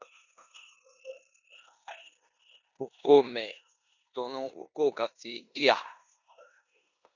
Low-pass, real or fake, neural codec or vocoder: 7.2 kHz; fake; codec, 16 kHz in and 24 kHz out, 0.9 kbps, LongCat-Audio-Codec, four codebook decoder